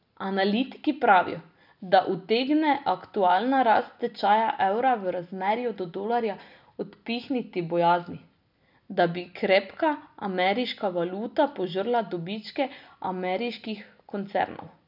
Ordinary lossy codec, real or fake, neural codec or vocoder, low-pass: none; real; none; 5.4 kHz